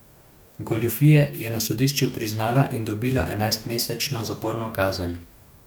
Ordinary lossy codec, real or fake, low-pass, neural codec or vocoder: none; fake; none; codec, 44.1 kHz, 2.6 kbps, DAC